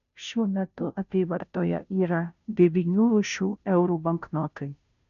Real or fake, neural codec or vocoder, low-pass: fake; codec, 16 kHz, 0.5 kbps, FunCodec, trained on Chinese and English, 25 frames a second; 7.2 kHz